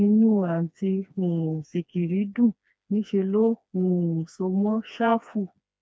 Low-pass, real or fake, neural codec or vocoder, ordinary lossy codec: none; fake; codec, 16 kHz, 2 kbps, FreqCodec, smaller model; none